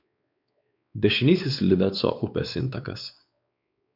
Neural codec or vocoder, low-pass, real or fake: codec, 16 kHz, 4 kbps, X-Codec, WavLM features, trained on Multilingual LibriSpeech; 5.4 kHz; fake